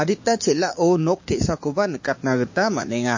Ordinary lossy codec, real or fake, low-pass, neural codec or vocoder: MP3, 32 kbps; fake; 7.2 kHz; codec, 16 kHz, 6 kbps, DAC